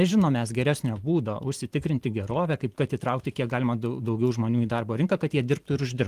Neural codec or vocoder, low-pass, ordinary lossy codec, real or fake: vocoder, 44.1 kHz, 128 mel bands every 256 samples, BigVGAN v2; 14.4 kHz; Opus, 24 kbps; fake